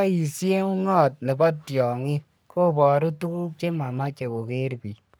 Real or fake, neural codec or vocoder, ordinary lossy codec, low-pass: fake; codec, 44.1 kHz, 3.4 kbps, Pupu-Codec; none; none